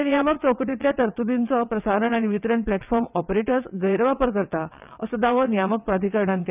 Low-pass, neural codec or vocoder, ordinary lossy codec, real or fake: 3.6 kHz; vocoder, 22.05 kHz, 80 mel bands, WaveNeXt; none; fake